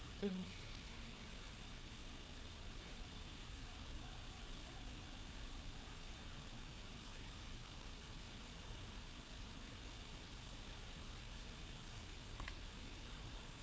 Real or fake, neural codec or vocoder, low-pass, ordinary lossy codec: fake; codec, 16 kHz, 4 kbps, FreqCodec, larger model; none; none